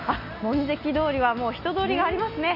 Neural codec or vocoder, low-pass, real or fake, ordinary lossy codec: none; 5.4 kHz; real; none